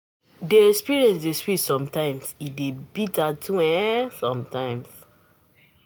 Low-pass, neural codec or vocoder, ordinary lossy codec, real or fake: none; none; none; real